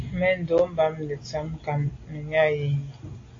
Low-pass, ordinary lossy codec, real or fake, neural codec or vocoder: 7.2 kHz; AAC, 32 kbps; real; none